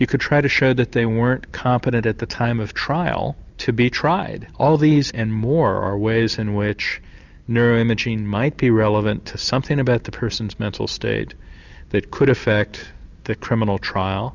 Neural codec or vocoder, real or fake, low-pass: none; real; 7.2 kHz